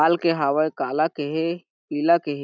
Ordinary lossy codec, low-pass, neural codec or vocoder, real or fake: none; 7.2 kHz; none; real